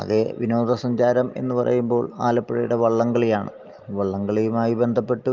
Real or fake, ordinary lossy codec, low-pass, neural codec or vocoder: real; Opus, 32 kbps; 7.2 kHz; none